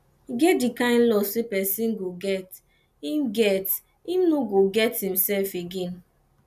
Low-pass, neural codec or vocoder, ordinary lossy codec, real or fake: 14.4 kHz; none; none; real